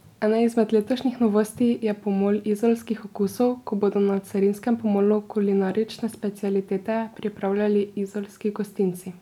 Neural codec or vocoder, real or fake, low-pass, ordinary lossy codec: none; real; 19.8 kHz; none